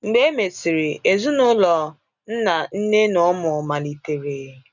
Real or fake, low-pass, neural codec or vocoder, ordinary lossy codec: real; 7.2 kHz; none; none